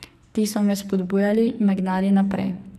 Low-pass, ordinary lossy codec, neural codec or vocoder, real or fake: 14.4 kHz; none; codec, 44.1 kHz, 2.6 kbps, SNAC; fake